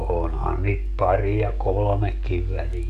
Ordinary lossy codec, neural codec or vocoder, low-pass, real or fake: AAC, 96 kbps; codec, 44.1 kHz, 7.8 kbps, DAC; 14.4 kHz; fake